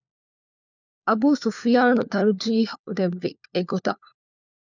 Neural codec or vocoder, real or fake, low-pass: codec, 16 kHz, 4 kbps, FunCodec, trained on LibriTTS, 50 frames a second; fake; 7.2 kHz